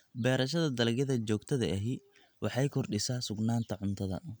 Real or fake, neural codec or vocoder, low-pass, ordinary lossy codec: real; none; none; none